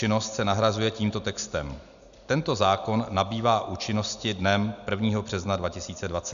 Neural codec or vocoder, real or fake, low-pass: none; real; 7.2 kHz